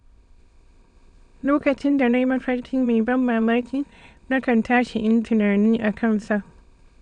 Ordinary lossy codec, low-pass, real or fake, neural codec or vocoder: none; 9.9 kHz; fake; autoencoder, 22.05 kHz, a latent of 192 numbers a frame, VITS, trained on many speakers